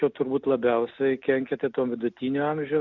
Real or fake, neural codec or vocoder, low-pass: real; none; 7.2 kHz